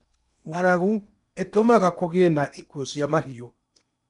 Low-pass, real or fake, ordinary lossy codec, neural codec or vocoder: 10.8 kHz; fake; none; codec, 16 kHz in and 24 kHz out, 0.8 kbps, FocalCodec, streaming, 65536 codes